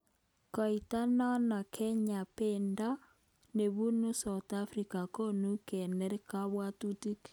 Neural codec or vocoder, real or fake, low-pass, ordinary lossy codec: none; real; none; none